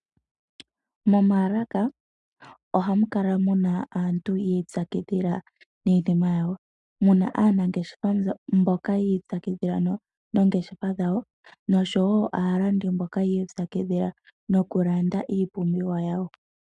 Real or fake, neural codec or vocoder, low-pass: real; none; 10.8 kHz